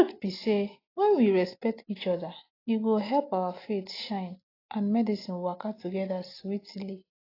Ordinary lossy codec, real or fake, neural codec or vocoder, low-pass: AAC, 24 kbps; real; none; 5.4 kHz